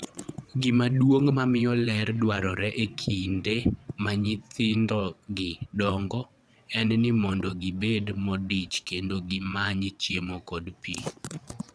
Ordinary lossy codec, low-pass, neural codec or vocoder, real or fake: none; none; vocoder, 22.05 kHz, 80 mel bands, WaveNeXt; fake